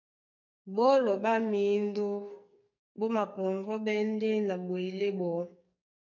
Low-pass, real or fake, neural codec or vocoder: 7.2 kHz; fake; codec, 44.1 kHz, 2.6 kbps, SNAC